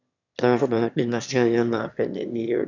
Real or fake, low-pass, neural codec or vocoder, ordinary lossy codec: fake; 7.2 kHz; autoencoder, 22.05 kHz, a latent of 192 numbers a frame, VITS, trained on one speaker; none